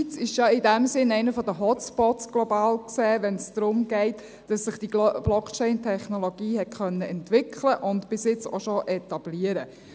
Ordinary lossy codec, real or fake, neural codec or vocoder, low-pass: none; real; none; none